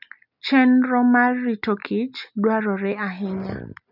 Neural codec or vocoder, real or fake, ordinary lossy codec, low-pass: none; real; none; 5.4 kHz